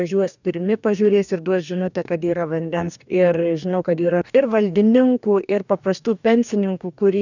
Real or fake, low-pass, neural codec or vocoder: fake; 7.2 kHz; codec, 44.1 kHz, 2.6 kbps, DAC